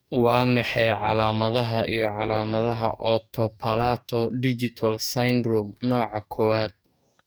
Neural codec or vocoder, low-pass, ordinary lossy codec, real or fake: codec, 44.1 kHz, 2.6 kbps, DAC; none; none; fake